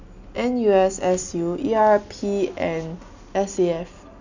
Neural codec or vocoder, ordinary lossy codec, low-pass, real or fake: none; none; 7.2 kHz; real